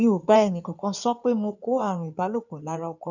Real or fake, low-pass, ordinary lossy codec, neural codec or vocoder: fake; 7.2 kHz; none; codec, 16 kHz in and 24 kHz out, 2.2 kbps, FireRedTTS-2 codec